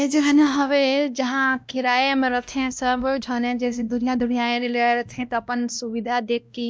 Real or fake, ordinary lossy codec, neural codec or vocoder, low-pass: fake; none; codec, 16 kHz, 1 kbps, X-Codec, WavLM features, trained on Multilingual LibriSpeech; none